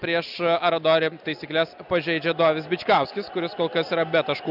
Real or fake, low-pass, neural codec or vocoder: real; 5.4 kHz; none